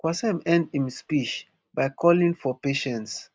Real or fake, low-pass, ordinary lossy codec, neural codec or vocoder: real; none; none; none